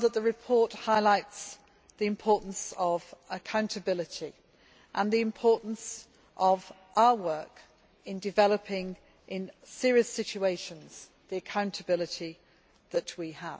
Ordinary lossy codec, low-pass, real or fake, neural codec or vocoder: none; none; real; none